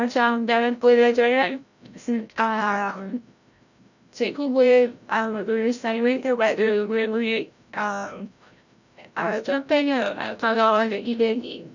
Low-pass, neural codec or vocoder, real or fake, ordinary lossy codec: 7.2 kHz; codec, 16 kHz, 0.5 kbps, FreqCodec, larger model; fake; none